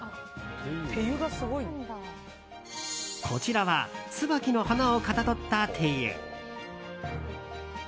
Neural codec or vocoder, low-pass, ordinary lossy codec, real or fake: none; none; none; real